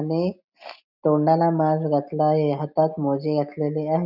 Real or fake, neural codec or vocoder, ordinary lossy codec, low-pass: real; none; none; 5.4 kHz